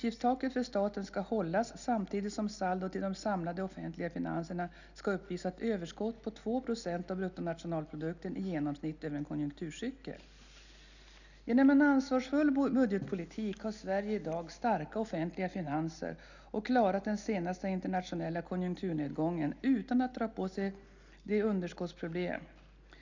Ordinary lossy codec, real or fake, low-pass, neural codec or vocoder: none; real; 7.2 kHz; none